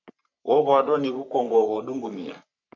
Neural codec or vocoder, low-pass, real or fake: codec, 44.1 kHz, 3.4 kbps, Pupu-Codec; 7.2 kHz; fake